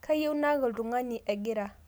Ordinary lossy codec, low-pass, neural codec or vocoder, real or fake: none; none; none; real